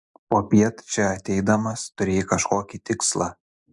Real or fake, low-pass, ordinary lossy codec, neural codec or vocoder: real; 10.8 kHz; MP3, 64 kbps; none